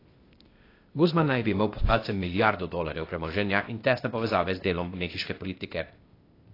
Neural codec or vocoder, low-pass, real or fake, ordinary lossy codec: codec, 16 kHz, 0.8 kbps, ZipCodec; 5.4 kHz; fake; AAC, 32 kbps